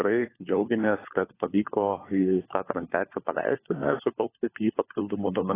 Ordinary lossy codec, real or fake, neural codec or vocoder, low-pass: AAC, 16 kbps; fake; codec, 16 kHz, 4 kbps, FunCodec, trained on LibriTTS, 50 frames a second; 3.6 kHz